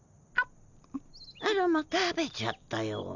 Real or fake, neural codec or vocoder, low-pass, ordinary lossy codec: real; none; 7.2 kHz; none